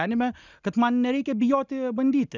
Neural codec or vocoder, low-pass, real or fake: none; 7.2 kHz; real